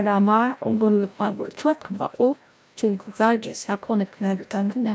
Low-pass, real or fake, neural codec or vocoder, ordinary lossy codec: none; fake; codec, 16 kHz, 0.5 kbps, FreqCodec, larger model; none